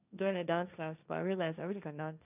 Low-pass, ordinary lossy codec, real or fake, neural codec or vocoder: 3.6 kHz; none; fake; codec, 16 kHz, 1.1 kbps, Voila-Tokenizer